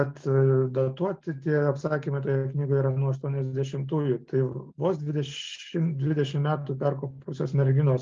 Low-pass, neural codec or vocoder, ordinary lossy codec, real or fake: 7.2 kHz; none; Opus, 24 kbps; real